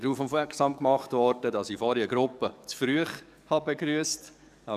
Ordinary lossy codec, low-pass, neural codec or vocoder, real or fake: none; 14.4 kHz; codec, 44.1 kHz, 7.8 kbps, DAC; fake